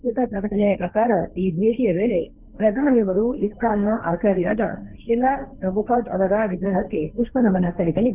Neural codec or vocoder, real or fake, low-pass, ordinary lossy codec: codec, 16 kHz, 1.1 kbps, Voila-Tokenizer; fake; 3.6 kHz; none